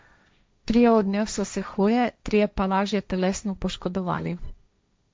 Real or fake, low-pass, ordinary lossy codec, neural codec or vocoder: fake; none; none; codec, 16 kHz, 1.1 kbps, Voila-Tokenizer